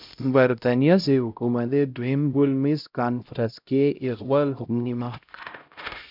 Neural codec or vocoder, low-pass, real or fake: codec, 16 kHz, 0.5 kbps, X-Codec, HuBERT features, trained on LibriSpeech; 5.4 kHz; fake